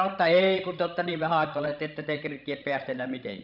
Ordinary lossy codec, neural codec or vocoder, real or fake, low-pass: none; codec, 16 kHz, 16 kbps, FreqCodec, larger model; fake; 5.4 kHz